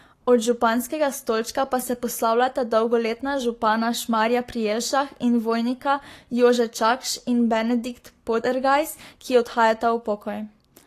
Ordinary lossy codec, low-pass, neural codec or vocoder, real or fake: AAC, 48 kbps; 14.4 kHz; codec, 44.1 kHz, 7.8 kbps, Pupu-Codec; fake